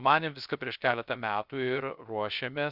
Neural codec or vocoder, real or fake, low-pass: codec, 16 kHz, 0.3 kbps, FocalCodec; fake; 5.4 kHz